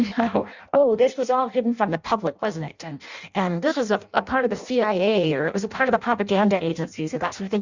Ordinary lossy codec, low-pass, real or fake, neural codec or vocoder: Opus, 64 kbps; 7.2 kHz; fake; codec, 16 kHz in and 24 kHz out, 0.6 kbps, FireRedTTS-2 codec